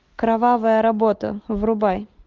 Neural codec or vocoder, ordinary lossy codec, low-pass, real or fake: none; Opus, 32 kbps; 7.2 kHz; real